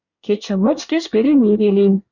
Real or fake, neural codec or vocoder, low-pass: fake; codec, 24 kHz, 1 kbps, SNAC; 7.2 kHz